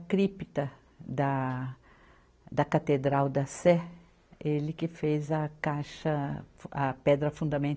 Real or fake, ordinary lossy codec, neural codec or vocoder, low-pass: real; none; none; none